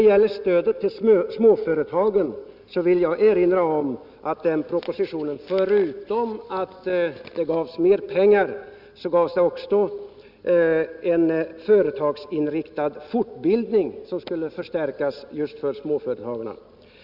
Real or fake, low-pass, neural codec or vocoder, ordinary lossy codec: real; 5.4 kHz; none; none